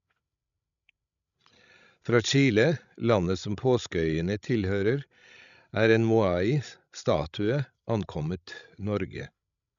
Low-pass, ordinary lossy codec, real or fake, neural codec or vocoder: 7.2 kHz; none; fake; codec, 16 kHz, 16 kbps, FreqCodec, larger model